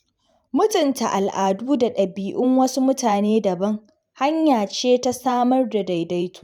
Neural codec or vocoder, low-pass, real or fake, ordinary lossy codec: vocoder, 44.1 kHz, 128 mel bands every 512 samples, BigVGAN v2; 19.8 kHz; fake; none